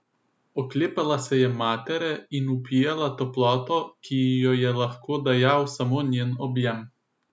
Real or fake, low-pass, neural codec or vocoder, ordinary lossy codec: real; none; none; none